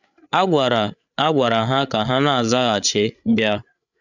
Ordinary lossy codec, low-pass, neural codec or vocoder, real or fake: none; 7.2 kHz; codec, 16 kHz, 8 kbps, FreqCodec, larger model; fake